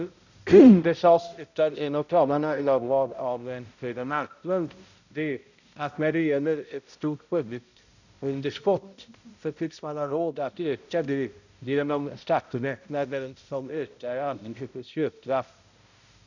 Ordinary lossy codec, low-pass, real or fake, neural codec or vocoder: none; 7.2 kHz; fake; codec, 16 kHz, 0.5 kbps, X-Codec, HuBERT features, trained on balanced general audio